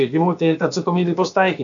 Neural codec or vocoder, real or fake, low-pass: codec, 16 kHz, about 1 kbps, DyCAST, with the encoder's durations; fake; 7.2 kHz